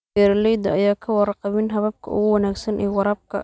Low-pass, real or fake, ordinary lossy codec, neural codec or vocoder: none; real; none; none